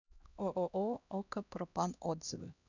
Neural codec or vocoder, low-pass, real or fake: codec, 16 kHz, 1 kbps, X-Codec, HuBERT features, trained on LibriSpeech; 7.2 kHz; fake